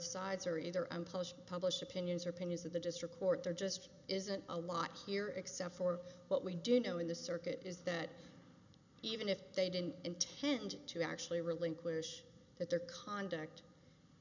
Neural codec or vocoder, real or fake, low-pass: none; real; 7.2 kHz